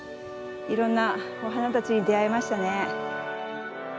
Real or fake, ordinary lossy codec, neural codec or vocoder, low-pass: real; none; none; none